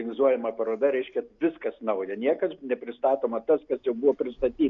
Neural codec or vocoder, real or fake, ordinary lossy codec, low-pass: none; real; MP3, 96 kbps; 7.2 kHz